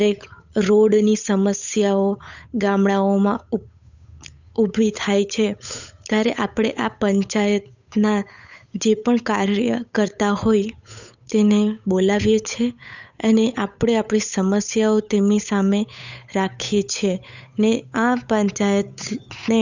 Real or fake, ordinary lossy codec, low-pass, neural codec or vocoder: fake; none; 7.2 kHz; codec, 16 kHz, 8 kbps, FunCodec, trained on Chinese and English, 25 frames a second